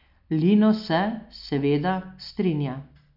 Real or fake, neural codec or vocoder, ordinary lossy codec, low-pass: real; none; none; 5.4 kHz